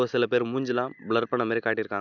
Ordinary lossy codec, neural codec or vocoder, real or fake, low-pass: none; none; real; 7.2 kHz